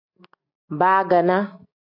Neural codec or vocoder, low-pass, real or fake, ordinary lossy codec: none; 5.4 kHz; real; AAC, 32 kbps